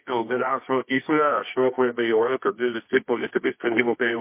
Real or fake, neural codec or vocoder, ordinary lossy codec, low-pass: fake; codec, 24 kHz, 0.9 kbps, WavTokenizer, medium music audio release; MP3, 24 kbps; 3.6 kHz